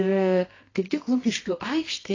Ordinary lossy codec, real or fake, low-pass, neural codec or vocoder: AAC, 32 kbps; fake; 7.2 kHz; codec, 24 kHz, 0.9 kbps, WavTokenizer, medium music audio release